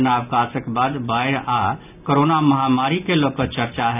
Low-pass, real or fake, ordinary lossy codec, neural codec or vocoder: 3.6 kHz; real; none; none